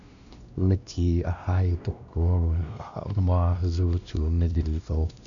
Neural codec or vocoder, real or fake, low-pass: codec, 16 kHz, 1 kbps, X-Codec, WavLM features, trained on Multilingual LibriSpeech; fake; 7.2 kHz